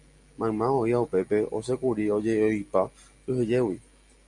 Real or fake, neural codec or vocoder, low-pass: real; none; 10.8 kHz